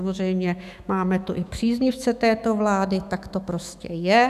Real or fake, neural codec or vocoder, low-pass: fake; autoencoder, 48 kHz, 128 numbers a frame, DAC-VAE, trained on Japanese speech; 14.4 kHz